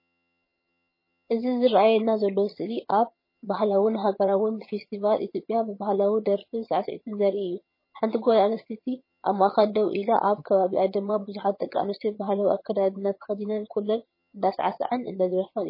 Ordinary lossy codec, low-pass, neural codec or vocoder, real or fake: MP3, 24 kbps; 5.4 kHz; vocoder, 22.05 kHz, 80 mel bands, HiFi-GAN; fake